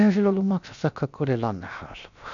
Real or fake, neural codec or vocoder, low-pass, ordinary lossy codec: fake; codec, 16 kHz, 0.7 kbps, FocalCodec; 7.2 kHz; none